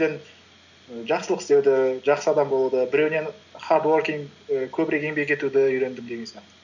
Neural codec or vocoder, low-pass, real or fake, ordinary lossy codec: none; 7.2 kHz; real; none